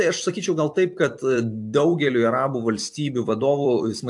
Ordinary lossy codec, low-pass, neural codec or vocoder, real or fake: AAC, 64 kbps; 10.8 kHz; vocoder, 44.1 kHz, 128 mel bands every 256 samples, BigVGAN v2; fake